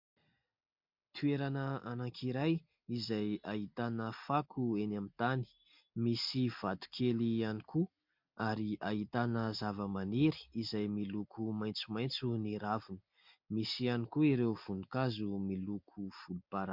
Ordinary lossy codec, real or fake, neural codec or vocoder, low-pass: MP3, 48 kbps; real; none; 5.4 kHz